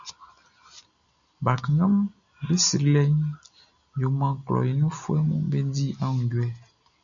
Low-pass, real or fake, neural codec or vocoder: 7.2 kHz; real; none